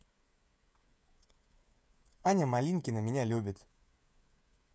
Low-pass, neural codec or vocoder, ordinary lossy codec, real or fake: none; codec, 16 kHz, 16 kbps, FreqCodec, smaller model; none; fake